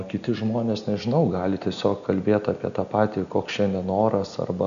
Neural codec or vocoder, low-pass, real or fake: none; 7.2 kHz; real